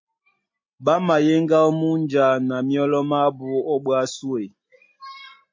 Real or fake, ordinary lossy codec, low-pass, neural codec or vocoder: real; MP3, 32 kbps; 7.2 kHz; none